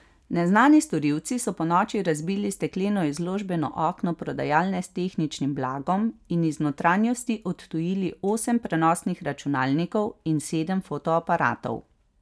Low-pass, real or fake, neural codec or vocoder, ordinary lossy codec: none; real; none; none